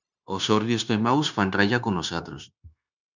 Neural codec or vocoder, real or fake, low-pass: codec, 16 kHz, 0.9 kbps, LongCat-Audio-Codec; fake; 7.2 kHz